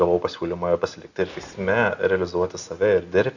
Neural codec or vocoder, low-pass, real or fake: none; 7.2 kHz; real